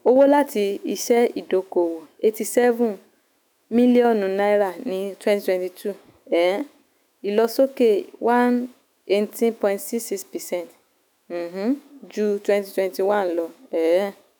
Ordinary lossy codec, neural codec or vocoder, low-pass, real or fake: none; autoencoder, 48 kHz, 128 numbers a frame, DAC-VAE, trained on Japanese speech; none; fake